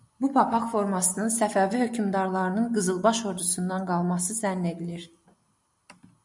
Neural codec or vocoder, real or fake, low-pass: none; real; 10.8 kHz